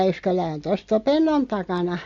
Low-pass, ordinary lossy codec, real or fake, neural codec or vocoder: 7.2 kHz; AAC, 48 kbps; real; none